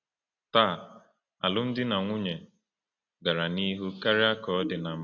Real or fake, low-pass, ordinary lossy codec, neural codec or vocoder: real; 7.2 kHz; AAC, 48 kbps; none